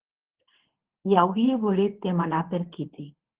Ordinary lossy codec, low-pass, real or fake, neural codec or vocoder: Opus, 16 kbps; 3.6 kHz; fake; codec, 24 kHz, 0.9 kbps, WavTokenizer, medium speech release version 2